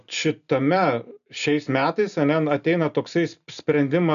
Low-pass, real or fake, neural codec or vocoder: 7.2 kHz; real; none